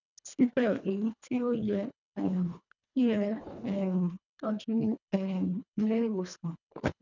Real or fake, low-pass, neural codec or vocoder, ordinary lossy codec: fake; 7.2 kHz; codec, 24 kHz, 1.5 kbps, HILCodec; none